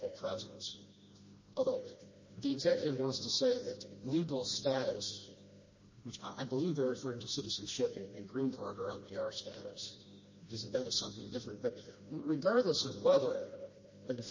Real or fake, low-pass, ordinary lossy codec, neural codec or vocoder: fake; 7.2 kHz; MP3, 32 kbps; codec, 16 kHz, 1 kbps, FreqCodec, smaller model